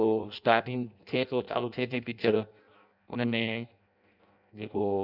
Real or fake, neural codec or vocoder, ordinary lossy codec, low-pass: fake; codec, 16 kHz in and 24 kHz out, 0.6 kbps, FireRedTTS-2 codec; none; 5.4 kHz